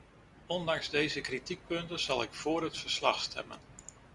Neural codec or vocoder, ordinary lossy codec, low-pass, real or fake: none; Opus, 64 kbps; 10.8 kHz; real